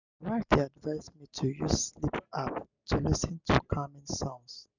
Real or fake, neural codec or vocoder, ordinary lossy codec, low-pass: real; none; none; 7.2 kHz